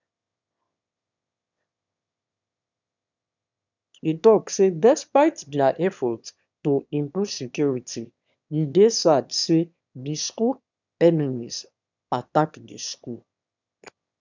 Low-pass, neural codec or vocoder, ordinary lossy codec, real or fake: 7.2 kHz; autoencoder, 22.05 kHz, a latent of 192 numbers a frame, VITS, trained on one speaker; none; fake